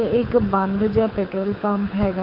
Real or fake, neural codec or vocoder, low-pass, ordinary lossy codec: fake; codec, 24 kHz, 6 kbps, HILCodec; 5.4 kHz; none